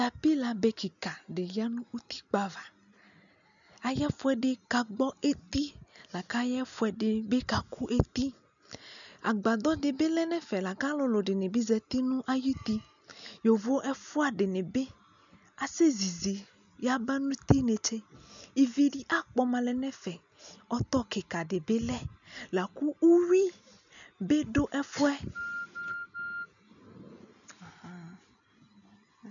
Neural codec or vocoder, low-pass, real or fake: none; 7.2 kHz; real